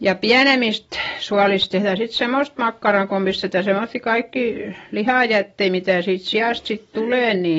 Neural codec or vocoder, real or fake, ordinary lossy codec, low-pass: none; real; AAC, 24 kbps; 19.8 kHz